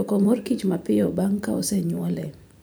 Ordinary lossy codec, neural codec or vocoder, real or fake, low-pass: none; vocoder, 44.1 kHz, 128 mel bands every 512 samples, BigVGAN v2; fake; none